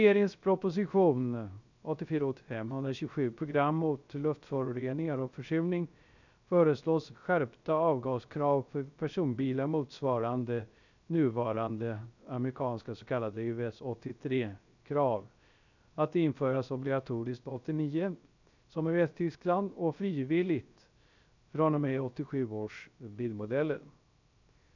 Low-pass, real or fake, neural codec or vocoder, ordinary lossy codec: 7.2 kHz; fake; codec, 16 kHz, 0.3 kbps, FocalCodec; none